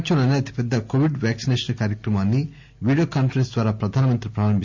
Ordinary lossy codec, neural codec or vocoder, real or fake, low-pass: MP3, 48 kbps; none; real; 7.2 kHz